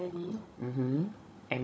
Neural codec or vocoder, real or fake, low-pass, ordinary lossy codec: codec, 16 kHz, 16 kbps, FunCodec, trained on Chinese and English, 50 frames a second; fake; none; none